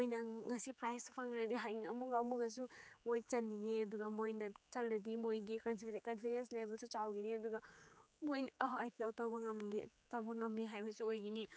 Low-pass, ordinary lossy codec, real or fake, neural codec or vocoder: none; none; fake; codec, 16 kHz, 4 kbps, X-Codec, HuBERT features, trained on general audio